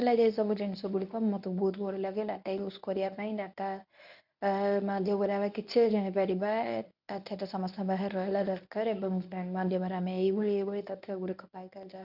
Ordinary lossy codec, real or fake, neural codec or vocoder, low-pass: none; fake; codec, 24 kHz, 0.9 kbps, WavTokenizer, medium speech release version 1; 5.4 kHz